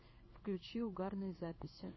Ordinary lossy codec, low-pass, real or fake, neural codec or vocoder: MP3, 24 kbps; 7.2 kHz; fake; codec, 16 kHz in and 24 kHz out, 1 kbps, XY-Tokenizer